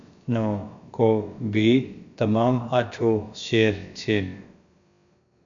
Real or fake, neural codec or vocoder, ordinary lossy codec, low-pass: fake; codec, 16 kHz, about 1 kbps, DyCAST, with the encoder's durations; MP3, 64 kbps; 7.2 kHz